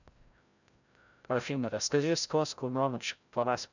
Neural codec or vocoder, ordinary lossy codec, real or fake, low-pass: codec, 16 kHz, 0.5 kbps, FreqCodec, larger model; none; fake; 7.2 kHz